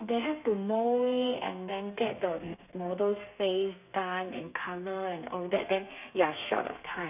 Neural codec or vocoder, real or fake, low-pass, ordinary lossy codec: codec, 32 kHz, 1.9 kbps, SNAC; fake; 3.6 kHz; none